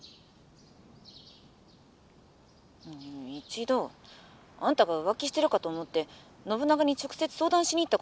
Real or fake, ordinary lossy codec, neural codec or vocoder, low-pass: real; none; none; none